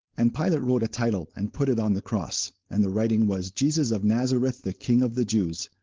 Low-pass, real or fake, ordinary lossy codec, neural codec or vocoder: 7.2 kHz; fake; Opus, 16 kbps; codec, 16 kHz, 4.8 kbps, FACodec